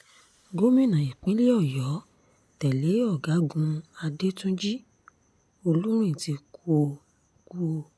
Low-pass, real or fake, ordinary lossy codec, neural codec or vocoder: none; real; none; none